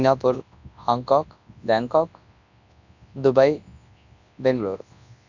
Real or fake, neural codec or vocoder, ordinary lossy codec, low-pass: fake; codec, 24 kHz, 0.9 kbps, WavTokenizer, large speech release; none; 7.2 kHz